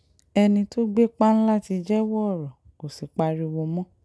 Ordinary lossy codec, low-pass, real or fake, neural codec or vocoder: none; none; real; none